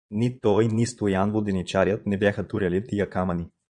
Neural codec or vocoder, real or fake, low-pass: vocoder, 22.05 kHz, 80 mel bands, Vocos; fake; 9.9 kHz